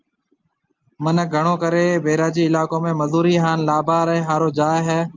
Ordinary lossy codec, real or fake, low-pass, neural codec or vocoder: Opus, 24 kbps; real; 7.2 kHz; none